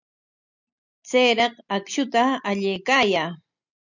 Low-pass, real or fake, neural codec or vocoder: 7.2 kHz; real; none